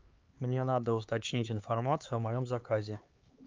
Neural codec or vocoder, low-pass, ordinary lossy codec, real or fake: codec, 16 kHz, 4 kbps, X-Codec, HuBERT features, trained on LibriSpeech; 7.2 kHz; Opus, 32 kbps; fake